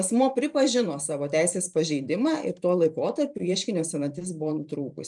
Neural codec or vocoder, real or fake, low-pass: vocoder, 44.1 kHz, 128 mel bands every 512 samples, BigVGAN v2; fake; 10.8 kHz